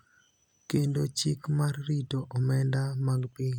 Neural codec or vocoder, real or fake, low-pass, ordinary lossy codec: none; real; 19.8 kHz; none